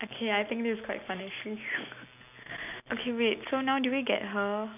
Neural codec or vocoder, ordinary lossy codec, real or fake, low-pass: none; none; real; 3.6 kHz